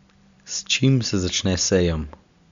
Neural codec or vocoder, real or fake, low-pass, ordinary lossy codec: none; real; 7.2 kHz; Opus, 64 kbps